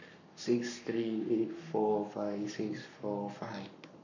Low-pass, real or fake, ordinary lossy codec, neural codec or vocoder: 7.2 kHz; fake; none; codec, 44.1 kHz, 7.8 kbps, Pupu-Codec